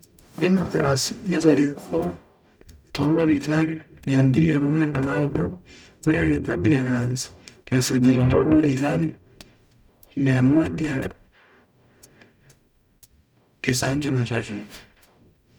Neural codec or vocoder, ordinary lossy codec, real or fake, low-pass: codec, 44.1 kHz, 0.9 kbps, DAC; none; fake; 19.8 kHz